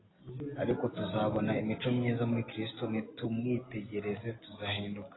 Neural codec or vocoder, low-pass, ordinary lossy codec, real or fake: none; 7.2 kHz; AAC, 16 kbps; real